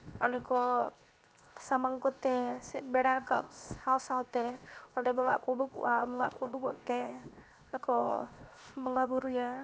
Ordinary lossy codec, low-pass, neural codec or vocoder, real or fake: none; none; codec, 16 kHz, 0.7 kbps, FocalCodec; fake